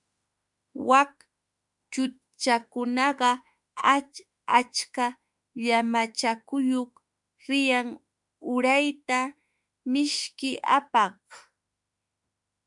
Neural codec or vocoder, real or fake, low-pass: autoencoder, 48 kHz, 32 numbers a frame, DAC-VAE, trained on Japanese speech; fake; 10.8 kHz